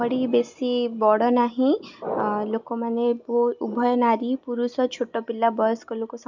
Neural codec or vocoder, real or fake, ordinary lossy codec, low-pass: none; real; none; 7.2 kHz